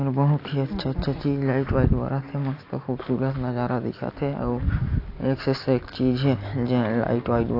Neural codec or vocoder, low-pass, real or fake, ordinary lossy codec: none; 5.4 kHz; real; AAC, 32 kbps